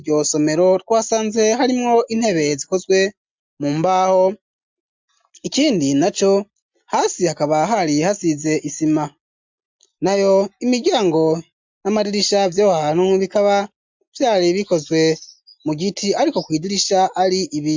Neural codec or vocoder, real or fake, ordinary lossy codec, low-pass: none; real; MP3, 64 kbps; 7.2 kHz